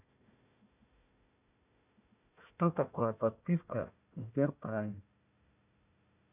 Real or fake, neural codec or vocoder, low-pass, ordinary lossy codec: fake; codec, 16 kHz, 1 kbps, FunCodec, trained on Chinese and English, 50 frames a second; 3.6 kHz; none